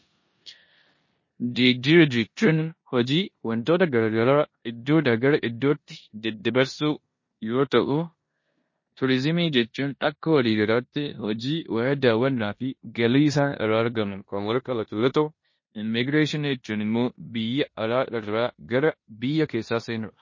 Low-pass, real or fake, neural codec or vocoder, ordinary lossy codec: 7.2 kHz; fake; codec, 16 kHz in and 24 kHz out, 0.9 kbps, LongCat-Audio-Codec, four codebook decoder; MP3, 32 kbps